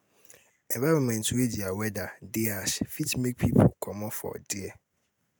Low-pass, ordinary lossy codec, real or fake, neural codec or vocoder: none; none; real; none